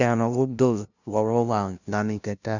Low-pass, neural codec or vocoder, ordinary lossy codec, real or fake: 7.2 kHz; codec, 16 kHz, 0.5 kbps, FunCodec, trained on LibriTTS, 25 frames a second; none; fake